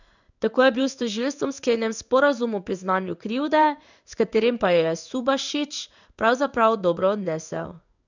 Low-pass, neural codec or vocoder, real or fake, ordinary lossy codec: 7.2 kHz; codec, 16 kHz in and 24 kHz out, 1 kbps, XY-Tokenizer; fake; none